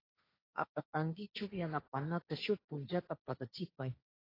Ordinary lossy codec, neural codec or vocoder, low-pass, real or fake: AAC, 24 kbps; codec, 16 kHz, 1.1 kbps, Voila-Tokenizer; 5.4 kHz; fake